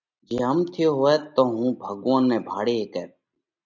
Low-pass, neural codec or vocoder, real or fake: 7.2 kHz; none; real